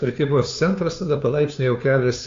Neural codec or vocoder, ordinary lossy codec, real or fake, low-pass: codec, 16 kHz, 2 kbps, FunCodec, trained on Chinese and English, 25 frames a second; AAC, 48 kbps; fake; 7.2 kHz